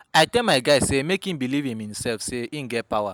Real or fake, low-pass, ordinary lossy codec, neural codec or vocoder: real; none; none; none